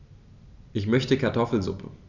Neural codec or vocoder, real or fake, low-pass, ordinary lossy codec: none; real; 7.2 kHz; none